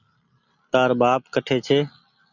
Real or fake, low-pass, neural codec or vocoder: real; 7.2 kHz; none